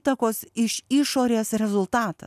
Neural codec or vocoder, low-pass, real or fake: none; 14.4 kHz; real